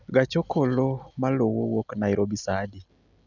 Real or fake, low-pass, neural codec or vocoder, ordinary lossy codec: real; 7.2 kHz; none; none